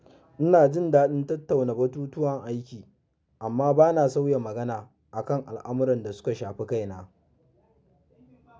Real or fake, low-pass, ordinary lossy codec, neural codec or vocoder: real; none; none; none